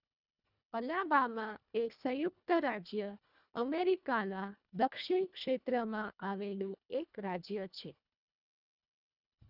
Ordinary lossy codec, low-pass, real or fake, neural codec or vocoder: none; 5.4 kHz; fake; codec, 24 kHz, 1.5 kbps, HILCodec